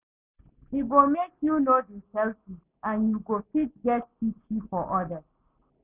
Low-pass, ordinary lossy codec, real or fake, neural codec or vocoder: 3.6 kHz; none; real; none